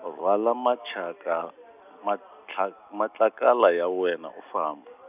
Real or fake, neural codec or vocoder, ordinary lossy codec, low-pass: real; none; none; 3.6 kHz